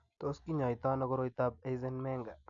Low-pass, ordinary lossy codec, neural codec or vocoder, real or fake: 7.2 kHz; none; none; real